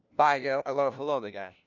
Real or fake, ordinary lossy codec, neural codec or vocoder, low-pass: fake; none; codec, 16 kHz, 1 kbps, FunCodec, trained on LibriTTS, 50 frames a second; 7.2 kHz